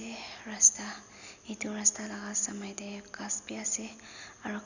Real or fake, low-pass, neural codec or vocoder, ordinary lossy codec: real; 7.2 kHz; none; none